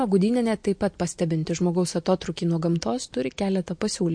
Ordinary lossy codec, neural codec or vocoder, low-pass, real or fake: MP3, 48 kbps; none; 9.9 kHz; real